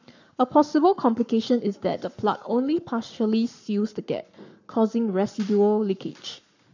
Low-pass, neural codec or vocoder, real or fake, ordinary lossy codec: 7.2 kHz; codec, 44.1 kHz, 7.8 kbps, Pupu-Codec; fake; none